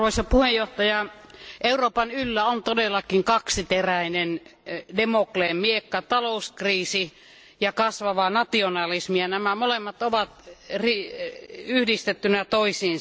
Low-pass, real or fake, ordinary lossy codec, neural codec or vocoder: none; real; none; none